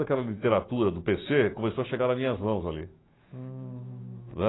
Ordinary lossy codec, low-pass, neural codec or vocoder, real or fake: AAC, 16 kbps; 7.2 kHz; autoencoder, 48 kHz, 128 numbers a frame, DAC-VAE, trained on Japanese speech; fake